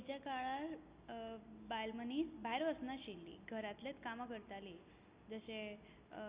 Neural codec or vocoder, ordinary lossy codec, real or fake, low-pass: none; Opus, 64 kbps; real; 3.6 kHz